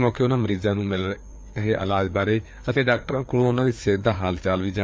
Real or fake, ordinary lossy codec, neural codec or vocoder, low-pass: fake; none; codec, 16 kHz, 4 kbps, FreqCodec, larger model; none